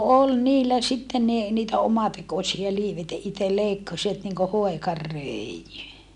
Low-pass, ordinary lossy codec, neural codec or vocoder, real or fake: 10.8 kHz; none; none; real